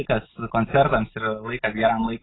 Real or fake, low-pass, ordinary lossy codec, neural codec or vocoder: real; 7.2 kHz; AAC, 16 kbps; none